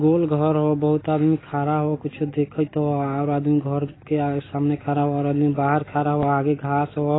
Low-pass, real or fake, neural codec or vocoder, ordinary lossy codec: 7.2 kHz; real; none; AAC, 16 kbps